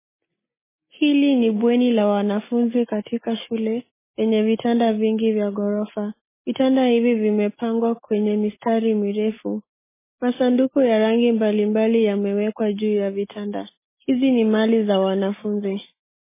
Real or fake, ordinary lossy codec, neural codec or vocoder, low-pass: real; MP3, 16 kbps; none; 3.6 kHz